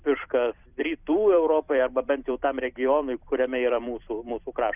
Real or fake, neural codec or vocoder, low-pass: fake; vocoder, 44.1 kHz, 128 mel bands every 256 samples, BigVGAN v2; 3.6 kHz